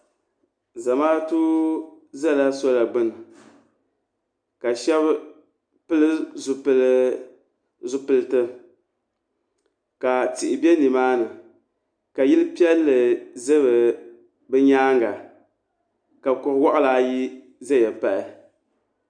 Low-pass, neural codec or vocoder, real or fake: 9.9 kHz; none; real